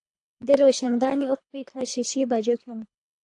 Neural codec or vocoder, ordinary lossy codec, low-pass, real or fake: codec, 24 kHz, 3 kbps, HILCodec; AAC, 64 kbps; 10.8 kHz; fake